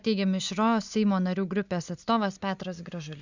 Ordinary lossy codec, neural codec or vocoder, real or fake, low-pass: Opus, 64 kbps; none; real; 7.2 kHz